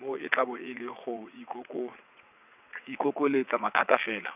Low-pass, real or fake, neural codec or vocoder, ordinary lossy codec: 3.6 kHz; fake; vocoder, 22.05 kHz, 80 mel bands, WaveNeXt; none